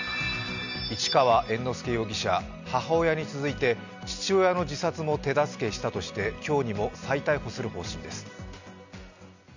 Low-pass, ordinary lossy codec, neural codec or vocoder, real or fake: 7.2 kHz; none; none; real